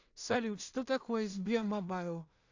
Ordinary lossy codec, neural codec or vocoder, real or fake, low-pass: AAC, 48 kbps; codec, 16 kHz in and 24 kHz out, 0.4 kbps, LongCat-Audio-Codec, two codebook decoder; fake; 7.2 kHz